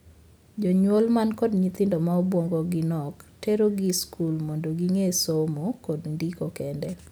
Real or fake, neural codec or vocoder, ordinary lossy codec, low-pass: real; none; none; none